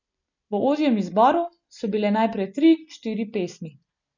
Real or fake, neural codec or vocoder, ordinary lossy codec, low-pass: real; none; none; 7.2 kHz